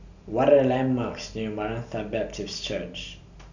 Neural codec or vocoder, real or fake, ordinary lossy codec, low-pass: none; real; none; 7.2 kHz